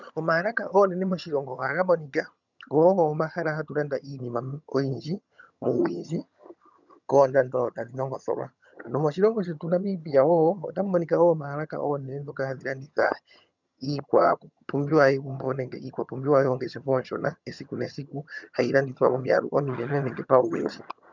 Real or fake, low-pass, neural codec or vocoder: fake; 7.2 kHz; vocoder, 22.05 kHz, 80 mel bands, HiFi-GAN